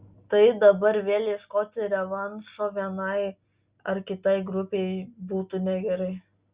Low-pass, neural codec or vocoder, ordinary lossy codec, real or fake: 3.6 kHz; none; Opus, 64 kbps; real